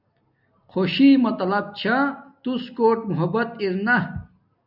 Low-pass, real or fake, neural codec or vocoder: 5.4 kHz; real; none